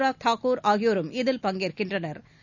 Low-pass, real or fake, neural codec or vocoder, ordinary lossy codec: 7.2 kHz; real; none; none